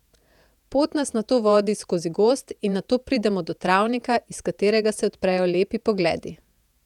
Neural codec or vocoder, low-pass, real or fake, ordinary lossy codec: vocoder, 48 kHz, 128 mel bands, Vocos; 19.8 kHz; fake; none